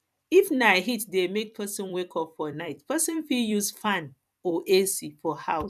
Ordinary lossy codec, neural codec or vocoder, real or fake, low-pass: none; vocoder, 44.1 kHz, 128 mel bands every 256 samples, BigVGAN v2; fake; 14.4 kHz